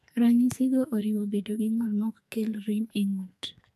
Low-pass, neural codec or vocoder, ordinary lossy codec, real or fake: 14.4 kHz; codec, 44.1 kHz, 2.6 kbps, SNAC; none; fake